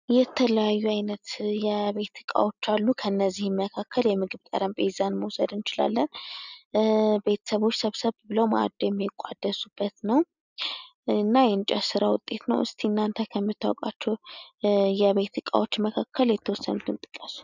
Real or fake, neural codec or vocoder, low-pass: real; none; 7.2 kHz